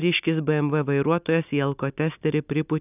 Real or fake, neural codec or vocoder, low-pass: real; none; 3.6 kHz